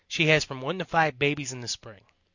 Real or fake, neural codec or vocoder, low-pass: real; none; 7.2 kHz